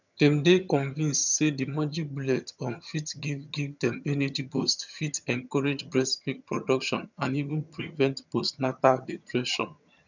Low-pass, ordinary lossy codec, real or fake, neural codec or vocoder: 7.2 kHz; none; fake; vocoder, 22.05 kHz, 80 mel bands, HiFi-GAN